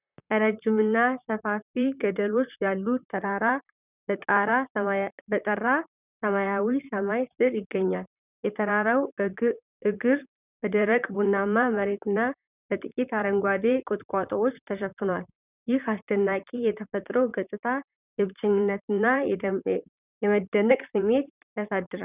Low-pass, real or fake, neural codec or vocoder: 3.6 kHz; fake; vocoder, 44.1 kHz, 128 mel bands, Pupu-Vocoder